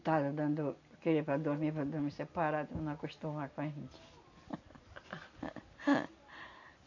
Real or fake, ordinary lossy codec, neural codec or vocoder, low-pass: real; AAC, 48 kbps; none; 7.2 kHz